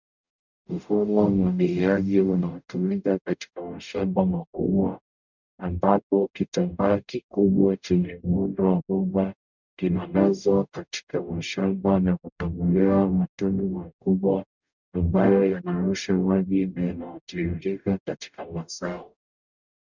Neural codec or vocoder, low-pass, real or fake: codec, 44.1 kHz, 0.9 kbps, DAC; 7.2 kHz; fake